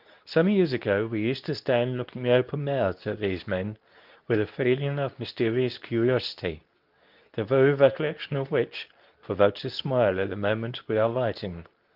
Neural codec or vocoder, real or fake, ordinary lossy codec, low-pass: codec, 24 kHz, 0.9 kbps, WavTokenizer, medium speech release version 2; fake; Opus, 24 kbps; 5.4 kHz